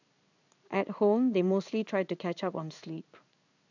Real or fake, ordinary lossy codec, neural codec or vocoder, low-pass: fake; none; codec, 16 kHz in and 24 kHz out, 1 kbps, XY-Tokenizer; 7.2 kHz